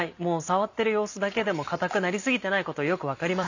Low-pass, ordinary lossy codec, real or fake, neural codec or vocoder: 7.2 kHz; none; real; none